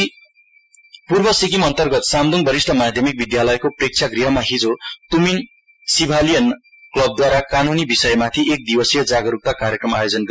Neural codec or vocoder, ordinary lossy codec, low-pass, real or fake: none; none; none; real